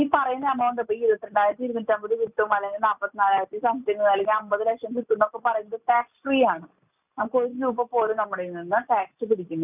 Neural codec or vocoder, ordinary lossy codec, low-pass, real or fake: none; none; 3.6 kHz; real